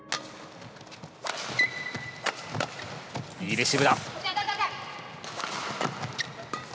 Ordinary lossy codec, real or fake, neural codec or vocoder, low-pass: none; real; none; none